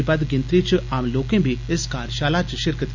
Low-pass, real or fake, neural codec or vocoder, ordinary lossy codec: 7.2 kHz; real; none; none